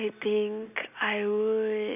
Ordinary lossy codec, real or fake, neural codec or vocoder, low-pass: none; real; none; 3.6 kHz